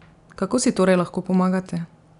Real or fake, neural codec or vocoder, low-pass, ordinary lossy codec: real; none; 10.8 kHz; none